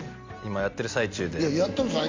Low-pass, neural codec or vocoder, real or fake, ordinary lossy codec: 7.2 kHz; none; real; MP3, 48 kbps